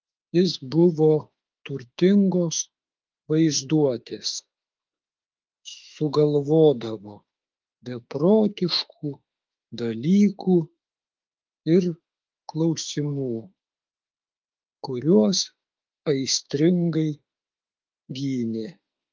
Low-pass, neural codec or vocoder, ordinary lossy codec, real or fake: 7.2 kHz; autoencoder, 48 kHz, 32 numbers a frame, DAC-VAE, trained on Japanese speech; Opus, 32 kbps; fake